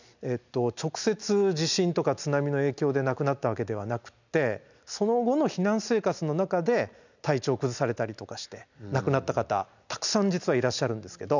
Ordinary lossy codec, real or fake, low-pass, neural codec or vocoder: none; real; 7.2 kHz; none